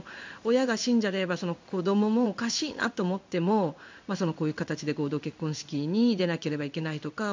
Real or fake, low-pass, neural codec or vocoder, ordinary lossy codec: fake; 7.2 kHz; codec, 16 kHz in and 24 kHz out, 1 kbps, XY-Tokenizer; MP3, 64 kbps